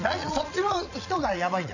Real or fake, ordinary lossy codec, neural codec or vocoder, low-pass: fake; none; vocoder, 22.05 kHz, 80 mel bands, Vocos; 7.2 kHz